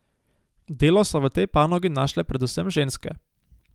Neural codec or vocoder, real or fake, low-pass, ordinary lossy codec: none; real; 19.8 kHz; Opus, 32 kbps